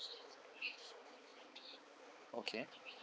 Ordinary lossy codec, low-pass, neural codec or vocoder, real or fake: none; none; codec, 16 kHz, 4 kbps, X-Codec, HuBERT features, trained on balanced general audio; fake